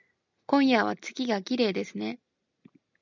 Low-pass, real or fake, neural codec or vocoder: 7.2 kHz; real; none